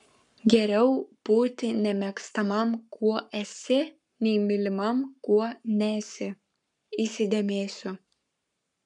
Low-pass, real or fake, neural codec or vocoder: 10.8 kHz; fake; codec, 44.1 kHz, 7.8 kbps, Pupu-Codec